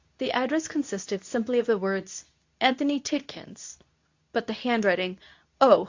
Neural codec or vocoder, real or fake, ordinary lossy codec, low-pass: codec, 24 kHz, 0.9 kbps, WavTokenizer, medium speech release version 2; fake; AAC, 48 kbps; 7.2 kHz